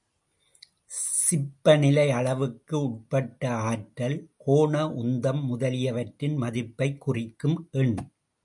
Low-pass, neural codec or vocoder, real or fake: 10.8 kHz; none; real